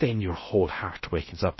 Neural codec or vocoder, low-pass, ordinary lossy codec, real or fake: codec, 16 kHz in and 24 kHz out, 0.6 kbps, FocalCodec, streaming, 2048 codes; 7.2 kHz; MP3, 24 kbps; fake